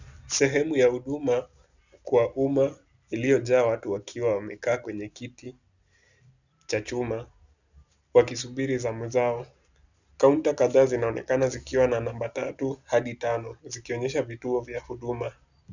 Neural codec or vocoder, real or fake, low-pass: none; real; 7.2 kHz